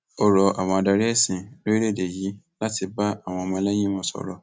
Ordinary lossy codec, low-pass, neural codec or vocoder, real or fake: none; none; none; real